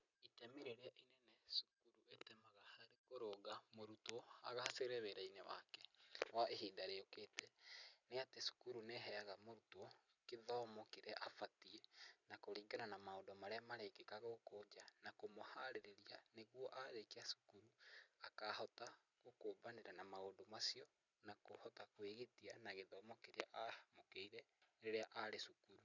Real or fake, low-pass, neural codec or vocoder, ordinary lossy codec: fake; 7.2 kHz; vocoder, 44.1 kHz, 128 mel bands every 256 samples, BigVGAN v2; none